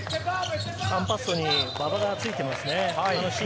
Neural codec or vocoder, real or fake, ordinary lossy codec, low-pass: none; real; none; none